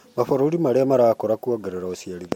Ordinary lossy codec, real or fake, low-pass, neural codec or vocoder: MP3, 64 kbps; real; 19.8 kHz; none